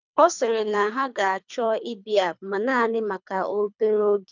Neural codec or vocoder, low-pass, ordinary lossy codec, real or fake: codec, 24 kHz, 3 kbps, HILCodec; 7.2 kHz; none; fake